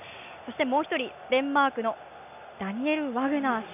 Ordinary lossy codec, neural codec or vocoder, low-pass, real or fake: none; none; 3.6 kHz; real